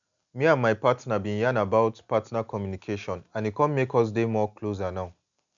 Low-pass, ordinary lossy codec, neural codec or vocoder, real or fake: 7.2 kHz; none; none; real